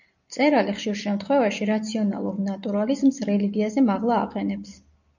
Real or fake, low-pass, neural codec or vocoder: real; 7.2 kHz; none